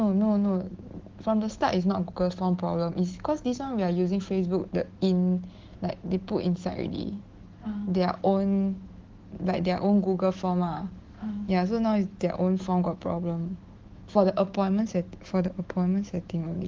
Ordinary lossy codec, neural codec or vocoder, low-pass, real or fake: Opus, 16 kbps; autoencoder, 48 kHz, 128 numbers a frame, DAC-VAE, trained on Japanese speech; 7.2 kHz; fake